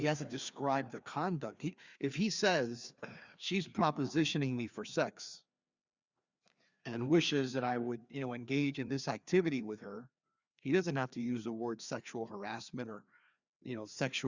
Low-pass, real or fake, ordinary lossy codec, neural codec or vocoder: 7.2 kHz; fake; Opus, 64 kbps; codec, 16 kHz, 2 kbps, FreqCodec, larger model